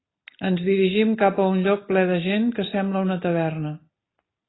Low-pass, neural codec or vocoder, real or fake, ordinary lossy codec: 7.2 kHz; none; real; AAC, 16 kbps